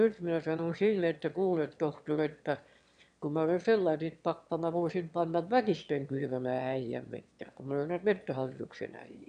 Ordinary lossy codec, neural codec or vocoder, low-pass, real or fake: none; autoencoder, 22.05 kHz, a latent of 192 numbers a frame, VITS, trained on one speaker; 9.9 kHz; fake